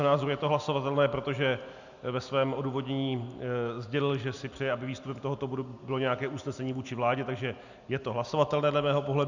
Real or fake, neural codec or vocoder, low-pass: real; none; 7.2 kHz